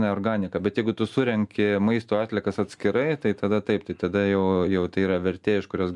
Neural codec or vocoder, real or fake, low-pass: none; real; 10.8 kHz